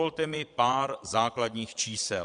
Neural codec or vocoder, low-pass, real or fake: vocoder, 22.05 kHz, 80 mel bands, Vocos; 9.9 kHz; fake